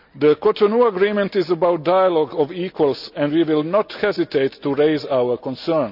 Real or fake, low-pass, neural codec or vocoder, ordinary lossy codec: real; 5.4 kHz; none; none